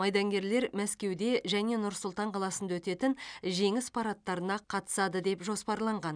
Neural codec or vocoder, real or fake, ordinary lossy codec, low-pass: none; real; none; 9.9 kHz